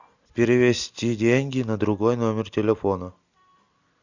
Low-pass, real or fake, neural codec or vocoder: 7.2 kHz; real; none